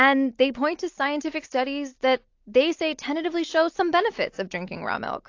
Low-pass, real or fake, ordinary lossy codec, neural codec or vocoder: 7.2 kHz; real; AAC, 48 kbps; none